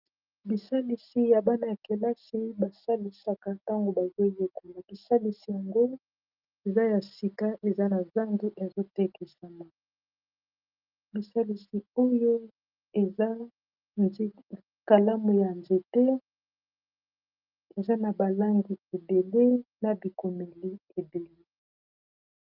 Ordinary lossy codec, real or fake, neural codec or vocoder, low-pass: Opus, 24 kbps; real; none; 5.4 kHz